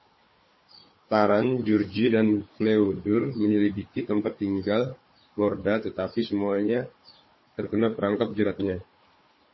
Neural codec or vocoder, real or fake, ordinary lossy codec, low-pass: codec, 16 kHz, 4 kbps, FunCodec, trained on Chinese and English, 50 frames a second; fake; MP3, 24 kbps; 7.2 kHz